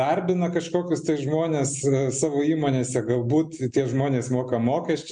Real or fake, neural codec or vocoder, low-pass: real; none; 9.9 kHz